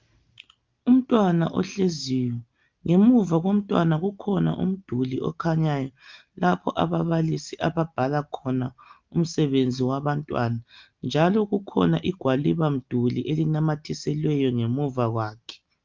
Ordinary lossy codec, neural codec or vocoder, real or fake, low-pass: Opus, 24 kbps; none; real; 7.2 kHz